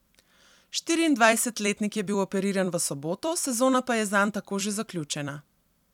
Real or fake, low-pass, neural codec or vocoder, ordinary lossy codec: fake; 19.8 kHz; vocoder, 44.1 kHz, 128 mel bands every 256 samples, BigVGAN v2; none